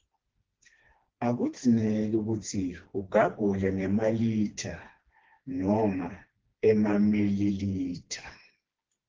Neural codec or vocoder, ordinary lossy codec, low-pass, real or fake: codec, 16 kHz, 2 kbps, FreqCodec, smaller model; Opus, 16 kbps; 7.2 kHz; fake